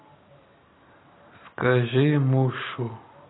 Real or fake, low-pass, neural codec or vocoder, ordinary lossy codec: real; 7.2 kHz; none; AAC, 16 kbps